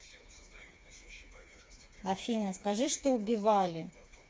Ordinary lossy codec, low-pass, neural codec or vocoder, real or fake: none; none; codec, 16 kHz, 4 kbps, FreqCodec, smaller model; fake